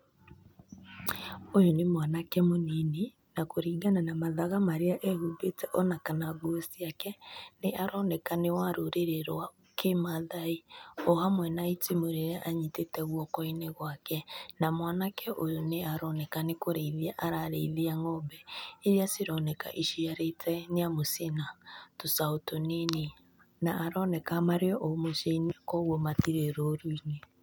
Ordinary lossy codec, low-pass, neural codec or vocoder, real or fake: none; none; none; real